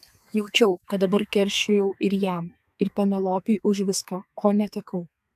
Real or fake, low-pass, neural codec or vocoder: fake; 14.4 kHz; codec, 32 kHz, 1.9 kbps, SNAC